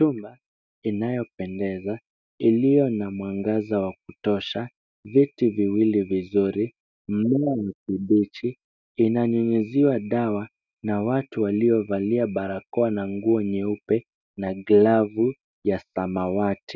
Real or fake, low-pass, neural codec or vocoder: real; 7.2 kHz; none